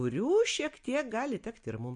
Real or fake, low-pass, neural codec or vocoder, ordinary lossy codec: real; 9.9 kHz; none; MP3, 64 kbps